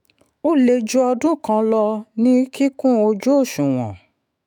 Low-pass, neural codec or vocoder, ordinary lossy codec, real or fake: 19.8 kHz; autoencoder, 48 kHz, 128 numbers a frame, DAC-VAE, trained on Japanese speech; none; fake